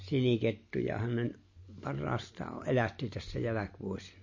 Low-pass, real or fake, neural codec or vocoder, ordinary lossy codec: 7.2 kHz; real; none; MP3, 32 kbps